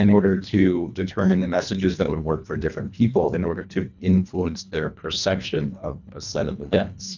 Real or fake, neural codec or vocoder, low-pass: fake; codec, 24 kHz, 1.5 kbps, HILCodec; 7.2 kHz